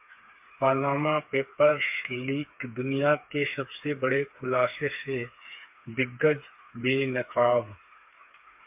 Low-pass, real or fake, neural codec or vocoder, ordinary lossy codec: 3.6 kHz; fake; codec, 16 kHz, 4 kbps, FreqCodec, smaller model; MP3, 32 kbps